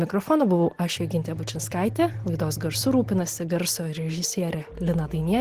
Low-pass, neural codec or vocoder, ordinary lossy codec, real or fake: 14.4 kHz; none; Opus, 16 kbps; real